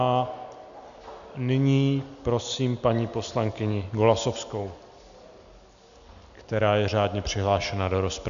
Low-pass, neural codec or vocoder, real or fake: 7.2 kHz; none; real